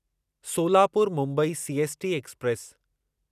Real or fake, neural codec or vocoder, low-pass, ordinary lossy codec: real; none; 14.4 kHz; none